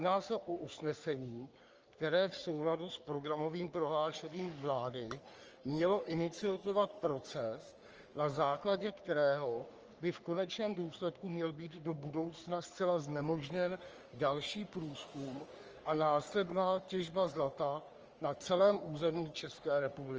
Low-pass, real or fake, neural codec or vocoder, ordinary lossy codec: 7.2 kHz; fake; codec, 44.1 kHz, 3.4 kbps, Pupu-Codec; Opus, 24 kbps